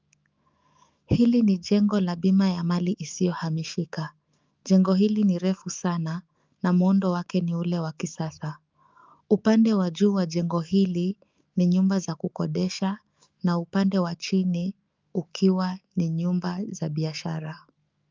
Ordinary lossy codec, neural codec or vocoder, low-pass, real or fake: Opus, 24 kbps; autoencoder, 48 kHz, 128 numbers a frame, DAC-VAE, trained on Japanese speech; 7.2 kHz; fake